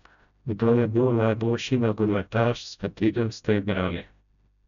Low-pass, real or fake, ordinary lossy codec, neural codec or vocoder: 7.2 kHz; fake; none; codec, 16 kHz, 0.5 kbps, FreqCodec, smaller model